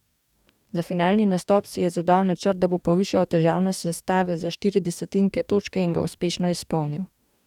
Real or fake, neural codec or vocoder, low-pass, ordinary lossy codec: fake; codec, 44.1 kHz, 2.6 kbps, DAC; 19.8 kHz; none